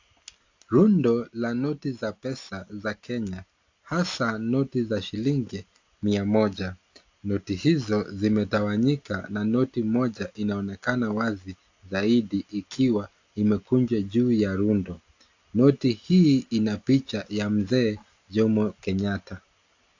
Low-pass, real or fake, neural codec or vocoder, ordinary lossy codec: 7.2 kHz; real; none; AAC, 48 kbps